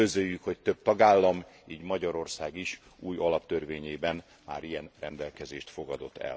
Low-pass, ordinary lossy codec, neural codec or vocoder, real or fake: none; none; none; real